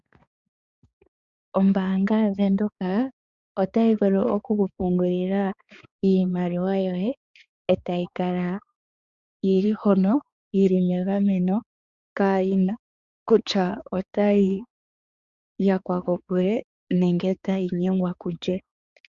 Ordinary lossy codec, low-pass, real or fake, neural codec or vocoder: Opus, 64 kbps; 7.2 kHz; fake; codec, 16 kHz, 4 kbps, X-Codec, HuBERT features, trained on balanced general audio